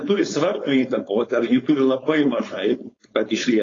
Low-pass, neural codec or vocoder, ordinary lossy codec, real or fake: 7.2 kHz; codec, 16 kHz, 4.8 kbps, FACodec; AAC, 32 kbps; fake